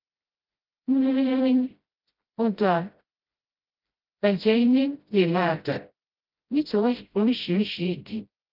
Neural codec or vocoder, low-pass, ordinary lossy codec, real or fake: codec, 16 kHz, 0.5 kbps, FreqCodec, smaller model; 5.4 kHz; Opus, 24 kbps; fake